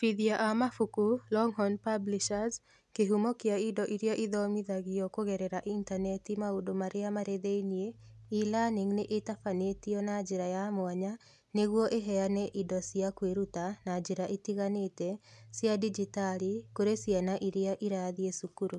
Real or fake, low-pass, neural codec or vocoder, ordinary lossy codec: real; none; none; none